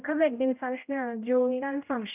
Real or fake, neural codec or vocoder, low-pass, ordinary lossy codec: fake; codec, 16 kHz, 0.5 kbps, X-Codec, HuBERT features, trained on general audio; 3.6 kHz; none